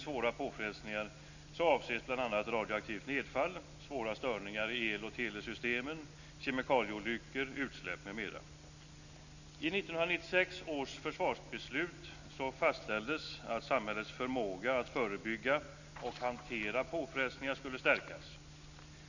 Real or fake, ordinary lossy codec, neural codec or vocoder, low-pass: real; none; none; 7.2 kHz